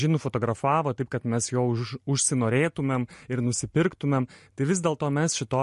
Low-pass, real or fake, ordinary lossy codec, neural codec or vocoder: 14.4 kHz; real; MP3, 48 kbps; none